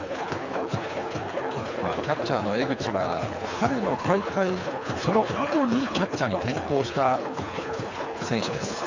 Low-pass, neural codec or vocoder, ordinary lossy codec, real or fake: 7.2 kHz; codec, 24 kHz, 3 kbps, HILCodec; AAC, 48 kbps; fake